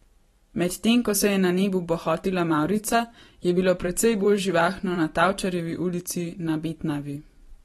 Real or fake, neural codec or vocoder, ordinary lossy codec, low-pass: fake; vocoder, 44.1 kHz, 128 mel bands every 512 samples, BigVGAN v2; AAC, 32 kbps; 19.8 kHz